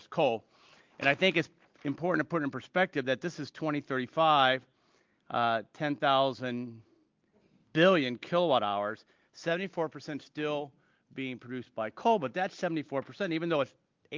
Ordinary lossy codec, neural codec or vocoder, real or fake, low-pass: Opus, 24 kbps; none; real; 7.2 kHz